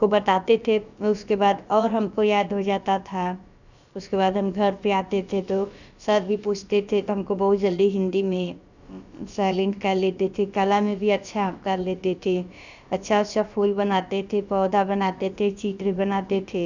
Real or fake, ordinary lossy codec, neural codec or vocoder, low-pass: fake; none; codec, 16 kHz, about 1 kbps, DyCAST, with the encoder's durations; 7.2 kHz